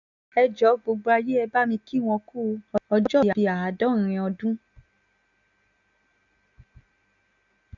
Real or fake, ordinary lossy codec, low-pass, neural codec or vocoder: real; none; 7.2 kHz; none